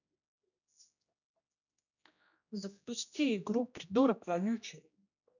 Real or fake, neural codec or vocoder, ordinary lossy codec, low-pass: fake; codec, 16 kHz, 1 kbps, X-Codec, HuBERT features, trained on general audio; none; 7.2 kHz